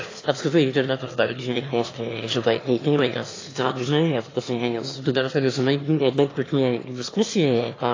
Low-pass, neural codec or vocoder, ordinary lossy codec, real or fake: 7.2 kHz; autoencoder, 22.05 kHz, a latent of 192 numbers a frame, VITS, trained on one speaker; AAC, 32 kbps; fake